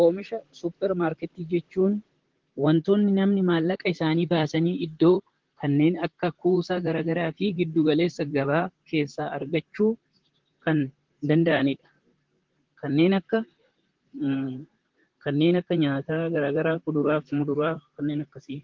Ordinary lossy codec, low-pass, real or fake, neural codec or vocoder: Opus, 16 kbps; 7.2 kHz; fake; vocoder, 44.1 kHz, 128 mel bands, Pupu-Vocoder